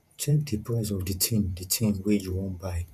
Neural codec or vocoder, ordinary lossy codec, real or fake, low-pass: vocoder, 48 kHz, 128 mel bands, Vocos; none; fake; 14.4 kHz